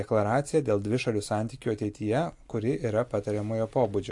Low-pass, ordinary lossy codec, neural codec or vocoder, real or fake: 10.8 kHz; AAC, 64 kbps; none; real